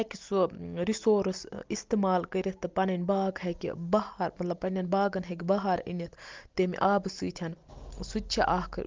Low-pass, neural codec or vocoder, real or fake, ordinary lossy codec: 7.2 kHz; none; real; Opus, 32 kbps